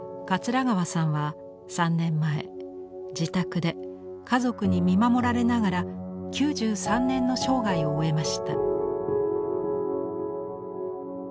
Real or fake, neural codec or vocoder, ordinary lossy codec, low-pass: real; none; none; none